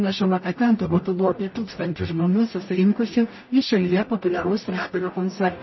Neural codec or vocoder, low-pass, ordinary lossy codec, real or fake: codec, 44.1 kHz, 0.9 kbps, DAC; 7.2 kHz; MP3, 24 kbps; fake